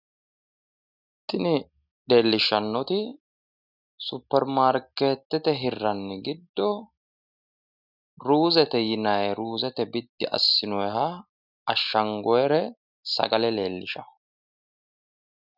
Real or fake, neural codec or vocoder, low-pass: real; none; 5.4 kHz